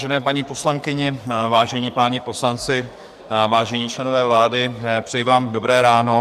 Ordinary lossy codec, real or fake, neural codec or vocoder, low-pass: MP3, 96 kbps; fake; codec, 44.1 kHz, 2.6 kbps, SNAC; 14.4 kHz